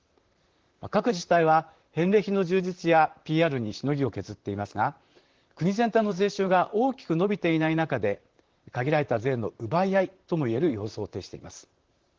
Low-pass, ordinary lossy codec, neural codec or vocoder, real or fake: 7.2 kHz; Opus, 16 kbps; vocoder, 44.1 kHz, 128 mel bands, Pupu-Vocoder; fake